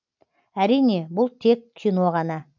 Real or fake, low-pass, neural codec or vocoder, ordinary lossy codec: real; 7.2 kHz; none; none